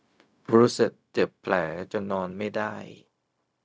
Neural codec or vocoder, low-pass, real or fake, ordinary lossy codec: codec, 16 kHz, 0.4 kbps, LongCat-Audio-Codec; none; fake; none